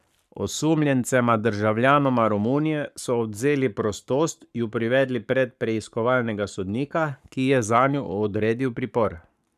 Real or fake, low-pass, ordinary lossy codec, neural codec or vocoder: fake; 14.4 kHz; none; codec, 44.1 kHz, 7.8 kbps, Pupu-Codec